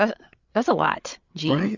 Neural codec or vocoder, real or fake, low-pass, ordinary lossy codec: codec, 16 kHz, 8 kbps, FreqCodec, larger model; fake; 7.2 kHz; Opus, 64 kbps